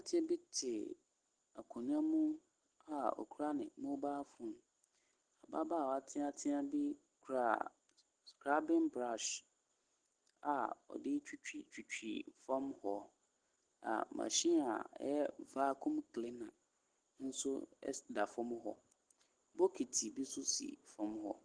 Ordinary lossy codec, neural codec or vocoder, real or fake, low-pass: Opus, 16 kbps; none; real; 9.9 kHz